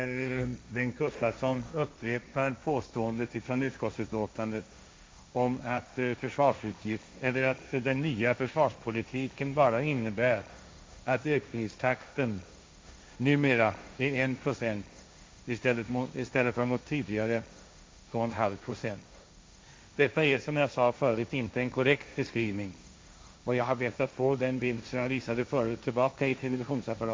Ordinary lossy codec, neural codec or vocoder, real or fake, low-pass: none; codec, 16 kHz, 1.1 kbps, Voila-Tokenizer; fake; none